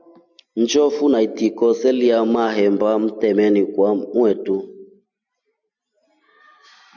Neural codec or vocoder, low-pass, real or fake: none; 7.2 kHz; real